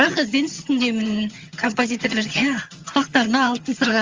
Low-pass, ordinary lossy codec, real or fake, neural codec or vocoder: 7.2 kHz; Opus, 32 kbps; fake; vocoder, 22.05 kHz, 80 mel bands, HiFi-GAN